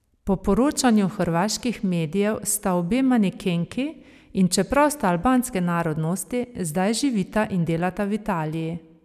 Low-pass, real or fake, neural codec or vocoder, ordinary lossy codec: 14.4 kHz; real; none; none